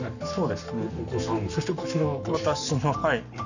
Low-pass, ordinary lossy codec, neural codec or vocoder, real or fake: 7.2 kHz; none; codec, 44.1 kHz, 7.8 kbps, Pupu-Codec; fake